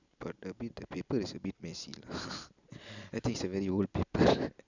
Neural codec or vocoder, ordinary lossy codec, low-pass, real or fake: none; none; 7.2 kHz; real